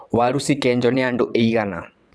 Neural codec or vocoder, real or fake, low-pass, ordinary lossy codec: vocoder, 22.05 kHz, 80 mel bands, WaveNeXt; fake; none; none